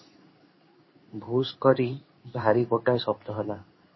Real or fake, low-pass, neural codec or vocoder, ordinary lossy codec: fake; 7.2 kHz; codec, 16 kHz in and 24 kHz out, 1 kbps, XY-Tokenizer; MP3, 24 kbps